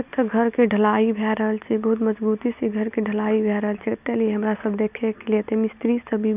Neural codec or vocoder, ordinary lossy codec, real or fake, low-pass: none; none; real; 3.6 kHz